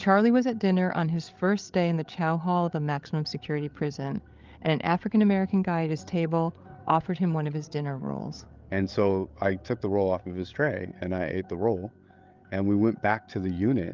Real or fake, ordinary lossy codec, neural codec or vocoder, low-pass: fake; Opus, 32 kbps; codec, 16 kHz, 8 kbps, FreqCodec, larger model; 7.2 kHz